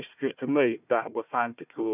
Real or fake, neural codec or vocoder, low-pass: fake; codec, 16 kHz, 1 kbps, FunCodec, trained on Chinese and English, 50 frames a second; 3.6 kHz